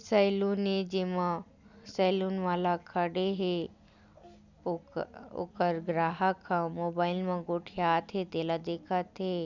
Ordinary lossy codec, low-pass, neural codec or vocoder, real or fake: none; 7.2 kHz; none; real